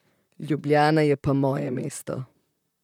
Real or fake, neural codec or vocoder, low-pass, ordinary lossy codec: fake; vocoder, 44.1 kHz, 128 mel bands, Pupu-Vocoder; 19.8 kHz; none